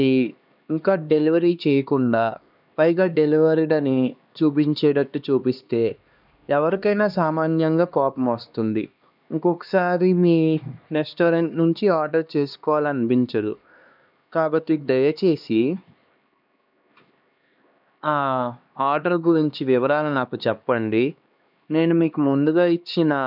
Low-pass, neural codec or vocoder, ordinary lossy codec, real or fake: 5.4 kHz; codec, 16 kHz, 2 kbps, X-Codec, HuBERT features, trained on LibriSpeech; none; fake